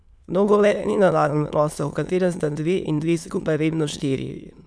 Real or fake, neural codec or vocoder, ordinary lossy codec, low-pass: fake; autoencoder, 22.05 kHz, a latent of 192 numbers a frame, VITS, trained on many speakers; none; none